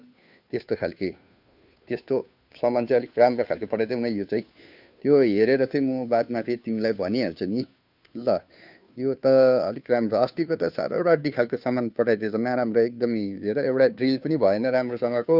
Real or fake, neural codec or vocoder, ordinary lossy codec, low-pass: fake; codec, 16 kHz, 2 kbps, FunCodec, trained on Chinese and English, 25 frames a second; none; 5.4 kHz